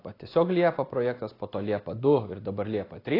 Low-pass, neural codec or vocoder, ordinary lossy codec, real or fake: 5.4 kHz; none; AAC, 24 kbps; real